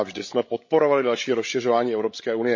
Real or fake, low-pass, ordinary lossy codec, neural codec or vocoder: fake; 7.2 kHz; MP3, 32 kbps; codec, 16 kHz, 16 kbps, FreqCodec, larger model